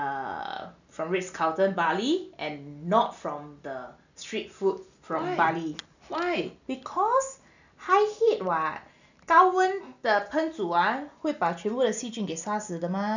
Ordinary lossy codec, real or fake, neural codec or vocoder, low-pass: none; real; none; 7.2 kHz